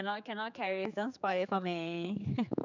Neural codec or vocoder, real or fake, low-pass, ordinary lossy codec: codec, 16 kHz, 4 kbps, X-Codec, HuBERT features, trained on general audio; fake; 7.2 kHz; none